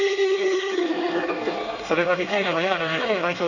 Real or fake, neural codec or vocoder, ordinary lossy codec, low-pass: fake; codec, 24 kHz, 1 kbps, SNAC; none; 7.2 kHz